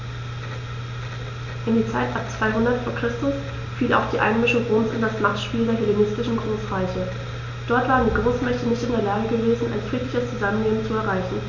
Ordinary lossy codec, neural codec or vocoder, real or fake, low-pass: none; none; real; 7.2 kHz